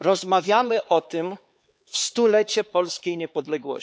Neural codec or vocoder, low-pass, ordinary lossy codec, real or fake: codec, 16 kHz, 4 kbps, X-Codec, HuBERT features, trained on LibriSpeech; none; none; fake